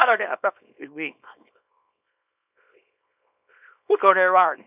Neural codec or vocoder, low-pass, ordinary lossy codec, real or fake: codec, 24 kHz, 0.9 kbps, WavTokenizer, small release; 3.6 kHz; none; fake